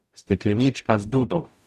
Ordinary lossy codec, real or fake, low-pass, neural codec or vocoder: Opus, 64 kbps; fake; 14.4 kHz; codec, 44.1 kHz, 0.9 kbps, DAC